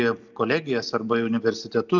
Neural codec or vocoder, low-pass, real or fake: none; 7.2 kHz; real